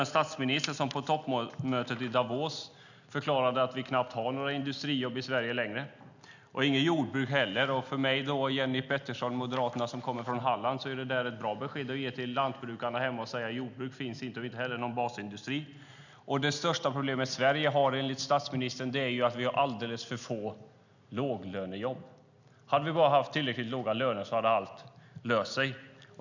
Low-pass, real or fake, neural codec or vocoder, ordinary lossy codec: 7.2 kHz; real; none; none